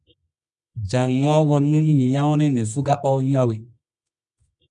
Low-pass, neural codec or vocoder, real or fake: 10.8 kHz; codec, 24 kHz, 0.9 kbps, WavTokenizer, medium music audio release; fake